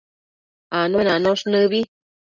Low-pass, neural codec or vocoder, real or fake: 7.2 kHz; none; real